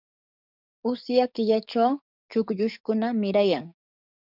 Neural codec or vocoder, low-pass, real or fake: codec, 44.1 kHz, 7.8 kbps, DAC; 5.4 kHz; fake